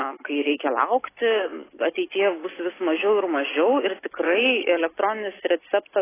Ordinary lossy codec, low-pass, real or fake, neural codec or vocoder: AAC, 16 kbps; 3.6 kHz; real; none